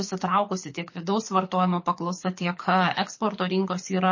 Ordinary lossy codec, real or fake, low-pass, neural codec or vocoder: MP3, 32 kbps; fake; 7.2 kHz; codec, 24 kHz, 6 kbps, HILCodec